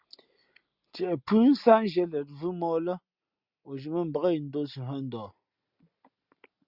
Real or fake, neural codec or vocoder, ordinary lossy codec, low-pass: real; none; Opus, 64 kbps; 5.4 kHz